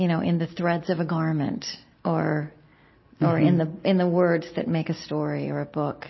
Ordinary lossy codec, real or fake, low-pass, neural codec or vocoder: MP3, 24 kbps; real; 7.2 kHz; none